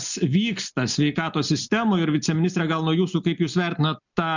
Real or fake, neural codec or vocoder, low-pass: real; none; 7.2 kHz